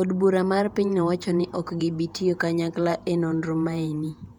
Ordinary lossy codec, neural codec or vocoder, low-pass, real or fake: MP3, 96 kbps; vocoder, 48 kHz, 128 mel bands, Vocos; 19.8 kHz; fake